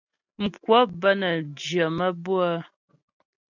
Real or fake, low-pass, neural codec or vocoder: real; 7.2 kHz; none